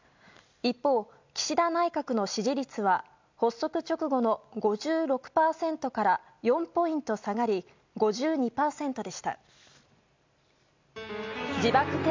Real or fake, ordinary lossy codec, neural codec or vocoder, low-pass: real; MP3, 64 kbps; none; 7.2 kHz